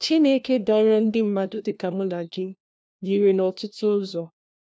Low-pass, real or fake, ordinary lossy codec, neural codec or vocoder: none; fake; none; codec, 16 kHz, 1 kbps, FunCodec, trained on LibriTTS, 50 frames a second